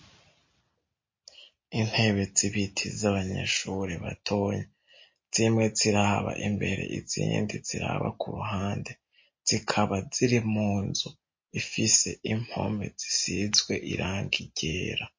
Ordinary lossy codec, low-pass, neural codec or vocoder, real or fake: MP3, 32 kbps; 7.2 kHz; none; real